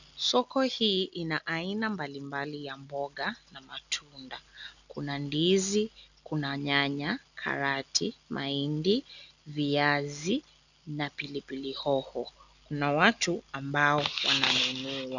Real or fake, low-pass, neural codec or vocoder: real; 7.2 kHz; none